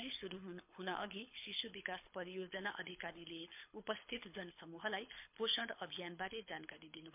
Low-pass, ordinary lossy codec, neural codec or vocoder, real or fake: 3.6 kHz; MP3, 32 kbps; codec, 24 kHz, 6 kbps, HILCodec; fake